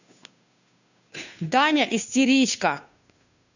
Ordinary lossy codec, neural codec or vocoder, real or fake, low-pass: none; codec, 16 kHz, 2 kbps, FunCodec, trained on Chinese and English, 25 frames a second; fake; 7.2 kHz